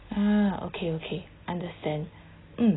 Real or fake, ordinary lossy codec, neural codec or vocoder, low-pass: real; AAC, 16 kbps; none; 7.2 kHz